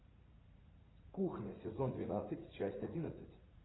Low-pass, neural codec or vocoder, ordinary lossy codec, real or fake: 7.2 kHz; vocoder, 44.1 kHz, 80 mel bands, Vocos; AAC, 16 kbps; fake